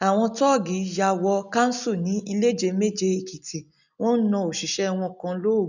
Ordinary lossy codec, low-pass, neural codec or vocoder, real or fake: none; 7.2 kHz; none; real